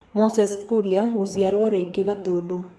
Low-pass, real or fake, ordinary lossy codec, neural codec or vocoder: none; fake; none; codec, 24 kHz, 1 kbps, SNAC